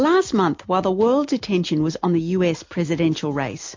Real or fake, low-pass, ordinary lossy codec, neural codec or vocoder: real; 7.2 kHz; MP3, 48 kbps; none